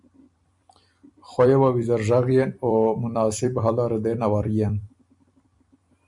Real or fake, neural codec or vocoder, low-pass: fake; vocoder, 44.1 kHz, 128 mel bands every 256 samples, BigVGAN v2; 10.8 kHz